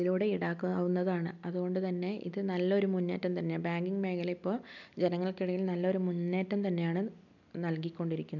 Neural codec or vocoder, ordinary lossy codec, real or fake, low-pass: none; none; real; 7.2 kHz